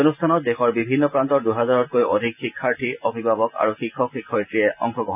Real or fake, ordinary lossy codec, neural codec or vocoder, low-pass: real; none; none; 3.6 kHz